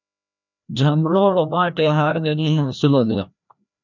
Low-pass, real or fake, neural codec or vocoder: 7.2 kHz; fake; codec, 16 kHz, 1 kbps, FreqCodec, larger model